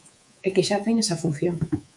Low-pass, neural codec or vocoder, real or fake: 10.8 kHz; codec, 24 kHz, 3.1 kbps, DualCodec; fake